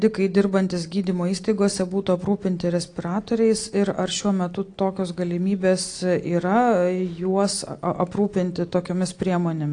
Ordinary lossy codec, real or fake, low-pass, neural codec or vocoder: AAC, 48 kbps; real; 9.9 kHz; none